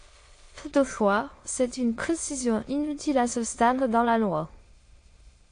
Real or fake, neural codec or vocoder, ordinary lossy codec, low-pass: fake; autoencoder, 22.05 kHz, a latent of 192 numbers a frame, VITS, trained on many speakers; AAC, 48 kbps; 9.9 kHz